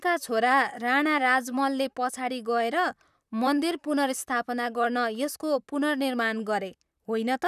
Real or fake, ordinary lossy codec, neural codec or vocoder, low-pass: fake; none; vocoder, 44.1 kHz, 128 mel bands, Pupu-Vocoder; 14.4 kHz